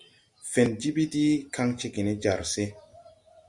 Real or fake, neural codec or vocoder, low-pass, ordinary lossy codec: real; none; 10.8 kHz; Opus, 64 kbps